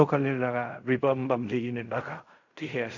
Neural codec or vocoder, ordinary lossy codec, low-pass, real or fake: codec, 16 kHz in and 24 kHz out, 0.4 kbps, LongCat-Audio-Codec, fine tuned four codebook decoder; none; 7.2 kHz; fake